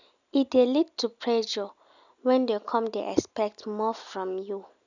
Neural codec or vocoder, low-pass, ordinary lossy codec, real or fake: none; 7.2 kHz; none; real